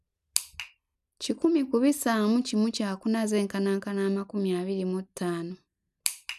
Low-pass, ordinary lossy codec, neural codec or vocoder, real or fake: 14.4 kHz; none; none; real